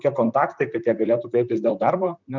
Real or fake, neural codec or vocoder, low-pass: fake; vocoder, 44.1 kHz, 128 mel bands, Pupu-Vocoder; 7.2 kHz